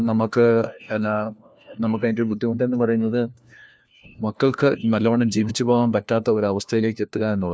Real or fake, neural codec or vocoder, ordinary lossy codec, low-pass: fake; codec, 16 kHz, 1 kbps, FunCodec, trained on LibriTTS, 50 frames a second; none; none